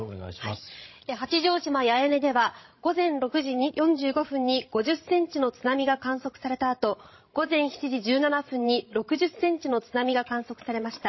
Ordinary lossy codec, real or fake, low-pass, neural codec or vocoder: MP3, 24 kbps; fake; 7.2 kHz; codec, 16 kHz, 16 kbps, FreqCodec, larger model